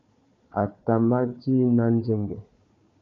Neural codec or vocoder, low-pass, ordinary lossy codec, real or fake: codec, 16 kHz, 4 kbps, FunCodec, trained on Chinese and English, 50 frames a second; 7.2 kHz; MP3, 64 kbps; fake